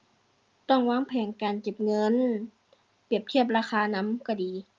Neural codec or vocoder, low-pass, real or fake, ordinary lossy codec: none; 7.2 kHz; real; Opus, 32 kbps